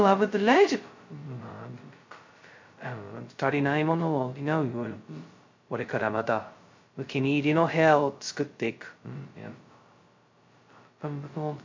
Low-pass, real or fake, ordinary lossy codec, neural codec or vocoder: 7.2 kHz; fake; MP3, 48 kbps; codec, 16 kHz, 0.2 kbps, FocalCodec